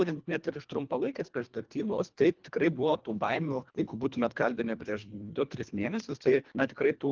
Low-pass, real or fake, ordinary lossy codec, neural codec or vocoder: 7.2 kHz; fake; Opus, 32 kbps; codec, 24 kHz, 1.5 kbps, HILCodec